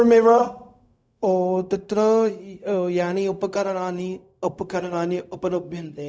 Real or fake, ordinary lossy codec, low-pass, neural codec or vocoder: fake; none; none; codec, 16 kHz, 0.4 kbps, LongCat-Audio-Codec